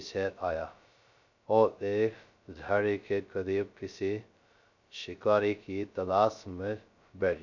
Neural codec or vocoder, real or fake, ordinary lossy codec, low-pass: codec, 16 kHz, 0.2 kbps, FocalCodec; fake; none; 7.2 kHz